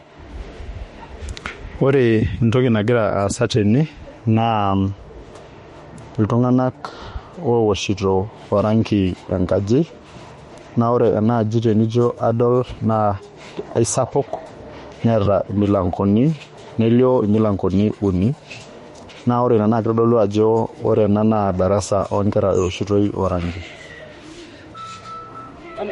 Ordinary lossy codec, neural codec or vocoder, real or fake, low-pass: MP3, 48 kbps; autoencoder, 48 kHz, 32 numbers a frame, DAC-VAE, trained on Japanese speech; fake; 19.8 kHz